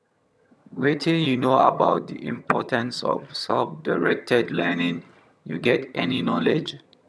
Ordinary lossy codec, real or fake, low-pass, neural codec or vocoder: none; fake; none; vocoder, 22.05 kHz, 80 mel bands, HiFi-GAN